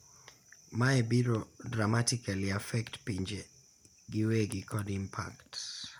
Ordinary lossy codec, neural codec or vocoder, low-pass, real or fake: none; none; 19.8 kHz; real